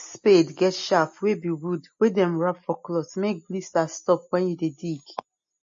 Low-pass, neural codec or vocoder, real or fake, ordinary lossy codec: 7.2 kHz; none; real; MP3, 32 kbps